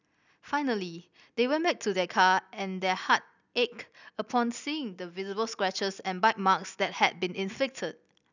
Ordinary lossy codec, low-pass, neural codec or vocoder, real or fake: none; 7.2 kHz; none; real